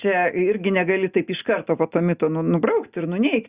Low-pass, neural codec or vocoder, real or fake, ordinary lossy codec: 3.6 kHz; none; real; Opus, 64 kbps